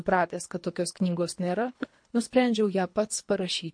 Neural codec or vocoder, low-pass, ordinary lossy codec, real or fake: codec, 24 kHz, 3 kbps, HILCodec; 9.9 kHz; MP3, 48 kbps; fake